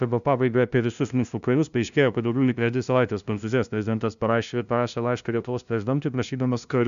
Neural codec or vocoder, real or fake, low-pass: codec, 16 kHz, 0.5 kbps, FunCodec, trained on LibriTTS, 25 frames a second; fake; 7.2 kHz